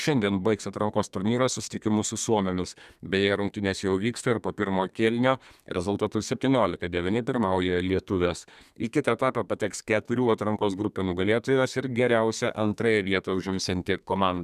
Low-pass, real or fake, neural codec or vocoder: 14.4 kHz; fake; codec, 32 kHz, 1.9 kbps, SNAC